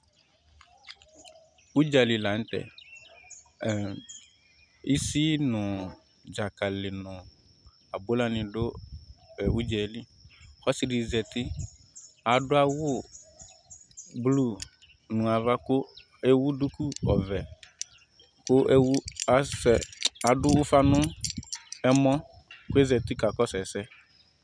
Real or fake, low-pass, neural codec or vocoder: real; 9.9 kHz; none